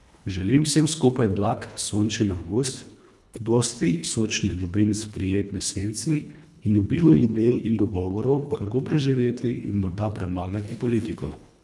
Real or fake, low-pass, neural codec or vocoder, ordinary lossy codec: fake; none; codec, 24 kHz, 1.5 kbps, HILCodec; none